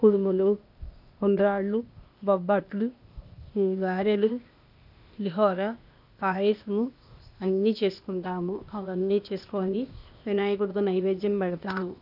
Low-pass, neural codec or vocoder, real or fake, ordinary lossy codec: 5.4 kHz; codec, 16 kHz, 0.8 kbps, ZipCodec; fake; none